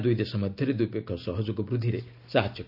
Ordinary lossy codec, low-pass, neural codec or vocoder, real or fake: none; 5.4 kHz; none; real